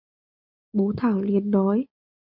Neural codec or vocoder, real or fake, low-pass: none; real; 5.4 kHz